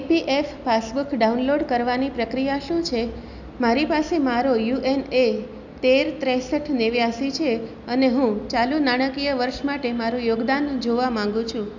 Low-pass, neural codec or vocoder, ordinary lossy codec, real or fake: 7.2 kHz; none; none; real